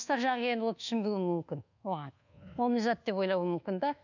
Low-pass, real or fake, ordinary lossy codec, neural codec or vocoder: 7.2 kHz; fake; none; codec, 24 kHz, 1.2 kbps, DualCodec